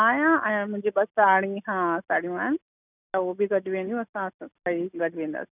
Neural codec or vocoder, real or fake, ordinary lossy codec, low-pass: none; real; none; 3.6 kHz